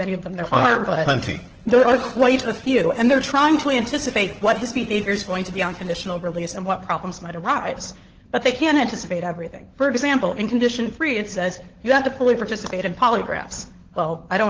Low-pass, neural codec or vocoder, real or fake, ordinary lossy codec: 7.2 kHz; codec, 16 kHz, 16 kbps, FunCodec, trained on LibriTTS, 50 frames a second; fake; Opus, 16 kbps